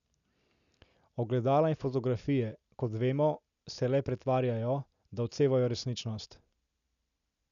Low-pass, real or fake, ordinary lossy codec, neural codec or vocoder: 7.2 kHz; real; none; none